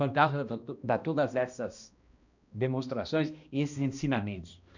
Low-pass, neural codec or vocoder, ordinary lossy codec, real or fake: 7.2 kHz; codec, 16 kHz, 1 kbps, X-Codec, HuBERT features, trained on balanced general audio; none; fake